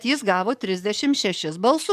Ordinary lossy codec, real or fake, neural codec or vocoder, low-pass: MP3, 96 kbps; fake; codec, 44.1 kHz, 7.8 kbps, DAC; 14.4 kHz